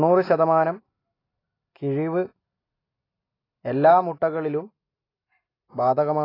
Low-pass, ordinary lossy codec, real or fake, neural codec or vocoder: 5.4 kHz; AAC, 24 kbps; real; none